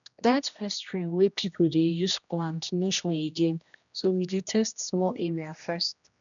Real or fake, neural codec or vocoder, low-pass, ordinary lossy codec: fake; codec, 16 kHz, 1 kbps, X-Codec, HuBERT features, trained on general audio; 7.2 kHz; none